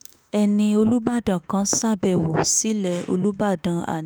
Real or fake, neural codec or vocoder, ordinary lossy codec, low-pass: fake; autoencoder, 48 kHz, 32 numbers a frame, DAC-VAE, trained on Japanese speech; none; none